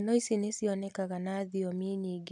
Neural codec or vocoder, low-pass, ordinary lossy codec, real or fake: none; none; none; real